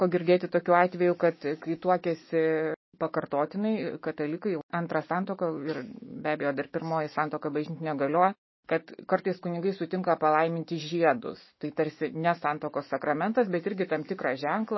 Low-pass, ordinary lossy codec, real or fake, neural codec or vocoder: 7.2 kHz; MP3, 24 kbps; fake; autoencoder, 48 kHz, 128 numbers a frame, DAC-VAE, trained on Japanese speech